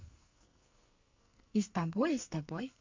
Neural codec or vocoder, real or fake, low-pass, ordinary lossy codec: codec, 44.1 kHz, 2.6 kbps, SNAC; fake; 7.2 kHz; MP3, 48 kbps